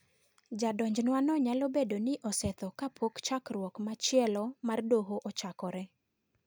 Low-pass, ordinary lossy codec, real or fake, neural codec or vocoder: none; none; real; none